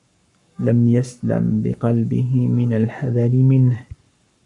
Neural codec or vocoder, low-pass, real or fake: codec, 44.1 kHz, 7.8 kbps, Pupu-Codec; 10.8 kHz; fake